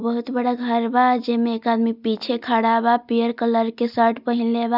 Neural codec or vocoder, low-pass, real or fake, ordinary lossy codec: none; 5.4 kHz; real; none